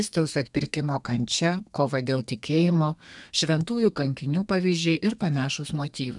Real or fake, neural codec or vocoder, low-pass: fake; codec, 32 kHz, 1.9 kbps, SNAC; 10.8 kHz